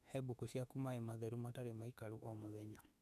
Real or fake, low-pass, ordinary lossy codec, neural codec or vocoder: fake; 14.4 kHz; none; autoencoder, 48 kHz, 32 numbers a frame, DAC-VAE, trained on Japanese speech